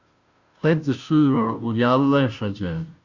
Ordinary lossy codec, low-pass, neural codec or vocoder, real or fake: Opus, 64 kbps; 7.2 kHz; codec, 16 kHz, 0.5 kbps, FunCodec, trained on Chinese and English, 25 frames a second; fake